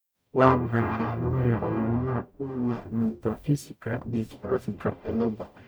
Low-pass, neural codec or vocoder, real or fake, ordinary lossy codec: none; codec, 44.1 kHz, 0.9 kbps, DAC; fake; none